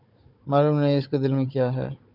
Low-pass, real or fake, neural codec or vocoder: 5.4 kHz; fake; codec, 16 kHz, 16 kbps, FunCodec, trained on Chinese and English, 50 frames a second